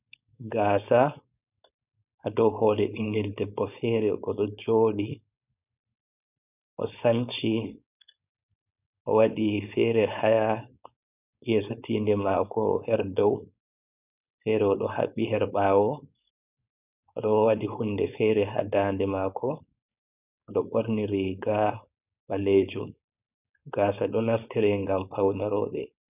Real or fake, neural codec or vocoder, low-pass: fake; codec, 16 kHz, 4.8 kbps, FACodec; 3.6 kHz